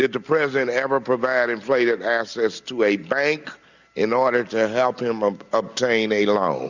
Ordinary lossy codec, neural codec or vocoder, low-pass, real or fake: Opus, 64 kbps; none; 7.2 kHz; real